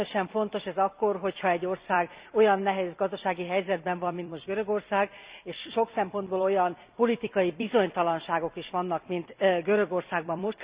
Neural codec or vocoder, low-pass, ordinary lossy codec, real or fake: vocoder, 44.1 kHz, 128 mel bands every 256 samples, BigVGAN v2; 3.6 kHz; Opus, 64 kbps; fake